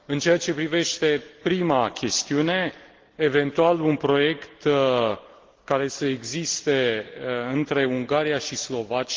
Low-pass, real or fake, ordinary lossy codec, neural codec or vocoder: 7.2 kHz; real; Opus, 16 kbps; none